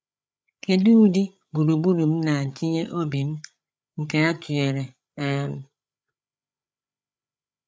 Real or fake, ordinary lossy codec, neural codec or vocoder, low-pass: fake; none; codec, 16 kHz, 8 kbps, FreqCodec, larger model; none